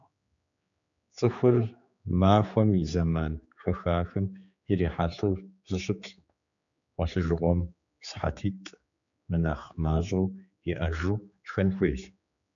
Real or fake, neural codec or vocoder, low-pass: fake; codec, 16 kHz, 4 kbps, X-Codec, HuBERT features, trained on general audio; 7.2 kHz